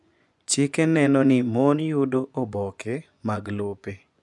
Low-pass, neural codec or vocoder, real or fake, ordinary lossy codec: 10.8 kHz; vocoder, 24 kHz, 100 mel bands, Vocos; fake; none